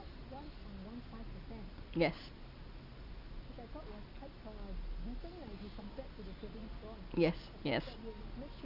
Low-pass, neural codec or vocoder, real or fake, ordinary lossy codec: 5.4 kHz; none; real; none